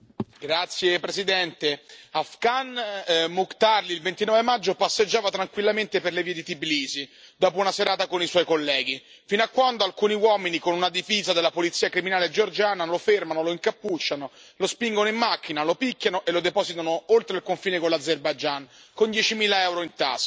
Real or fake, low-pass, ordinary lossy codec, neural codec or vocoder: real; none; none; none